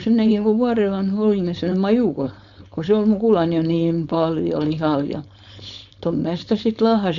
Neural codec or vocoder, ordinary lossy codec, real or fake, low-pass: codec, 16 kHz, 4.8 kbps, FACodec; none; fake; 7.2 kHz